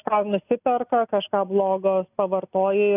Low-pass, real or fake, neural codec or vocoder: 3.6 kHz; real; none